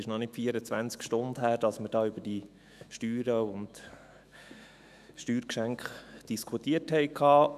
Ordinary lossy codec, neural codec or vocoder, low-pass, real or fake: none; autoencoder, 48 kHz, 128 numbers a frame, DAC-VAE, trained on Japanese speech; 14.4 kHz; fake